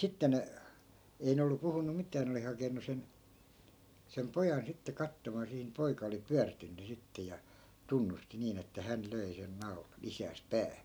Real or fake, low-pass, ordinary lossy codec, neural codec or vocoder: real; none; none; none